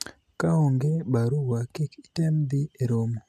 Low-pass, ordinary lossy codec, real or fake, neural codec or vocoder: 14.4 kHz; none; real; none